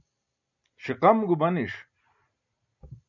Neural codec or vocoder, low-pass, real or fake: none; 7.2 kHz; real